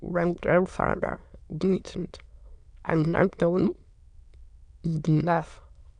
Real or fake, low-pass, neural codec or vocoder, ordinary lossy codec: fake; 9.9 kHz; autoencoder, 22.05 kHz, a latent of 192 numbers a frame, VITS, trained on many speakers; none